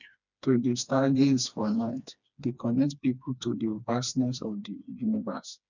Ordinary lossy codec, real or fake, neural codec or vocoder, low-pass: none; fake; codec, 16 kHz, 2 kbps, FreqCodec, smaller model; 7.2 kHz